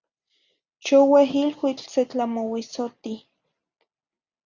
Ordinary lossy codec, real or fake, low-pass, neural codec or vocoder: Opus, 64 kbps; real; 7.2 kHz; none